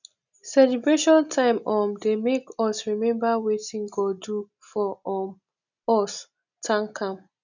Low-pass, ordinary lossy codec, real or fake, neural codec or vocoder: 7.2 kHz; none; real; none